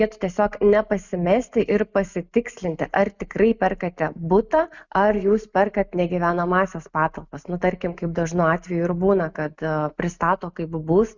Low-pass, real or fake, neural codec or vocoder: 7.2 kHz; real; none